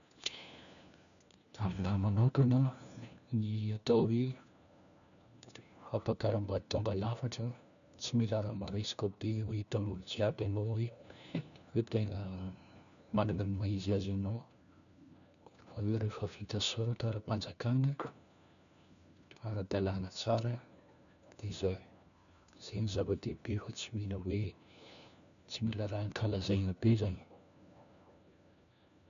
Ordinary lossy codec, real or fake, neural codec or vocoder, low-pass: none; fake; codec, 16 kHz, 1 kbps, FunCodec, trained on LibriTTS, 50 frames a second; 7.2 kHz